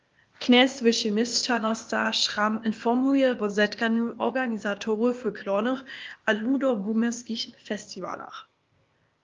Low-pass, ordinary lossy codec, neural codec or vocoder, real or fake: 7.2 kHz; Opus, 32 kbps; codec, 16 kHz, 0.8 kbps, ZipCodec; fake